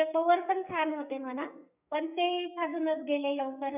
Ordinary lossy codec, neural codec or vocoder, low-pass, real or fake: none; codec, 44.1 kHz, 2.6 kbps, SNAC; 3.6 kHz; fake